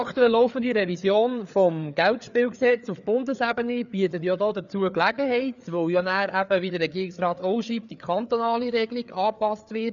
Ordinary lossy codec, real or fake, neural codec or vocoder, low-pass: none; fake; codec, 16 kHz, 4 kbps, FreqCodec, larger model; 7.2 kHz